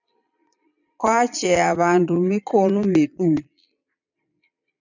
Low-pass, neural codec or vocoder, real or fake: 7.2 kHz; vocoder, 22.05 kHz, 80 mel bands, Vocos; fake